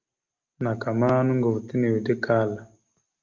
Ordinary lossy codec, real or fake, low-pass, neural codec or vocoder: Opus, 32 kbps; real; 7.2 kHz; none